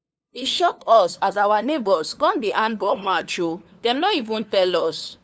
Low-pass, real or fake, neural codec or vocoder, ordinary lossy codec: none; fake; codec, 16 kHz, 2 kbps, FunCodec, trained on LibriTTS, 25 frames a second; none